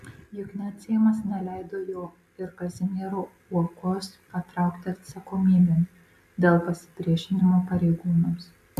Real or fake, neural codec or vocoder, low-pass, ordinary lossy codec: real; none; 14.4 kHz; AAC, 96 kbps